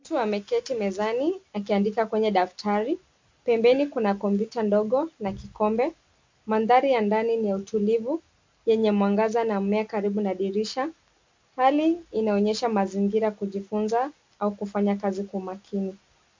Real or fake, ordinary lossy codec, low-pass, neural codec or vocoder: real; MP3, 48 kbps; 7.2 kHz; none